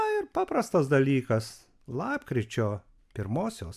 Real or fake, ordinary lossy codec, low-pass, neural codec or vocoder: real; AAC, 96 kbps; 14.4 kHz; none